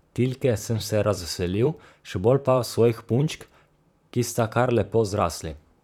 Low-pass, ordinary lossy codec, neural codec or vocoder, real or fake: 19.8 kHz; none; vocoder, 44.1 kHz, 128 mel bands, Pupu-Vocoder; fake